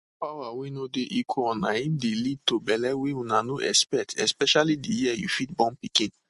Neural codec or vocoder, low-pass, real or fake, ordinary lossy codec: none; 14.4 kHz; real; MP3, 48 kbps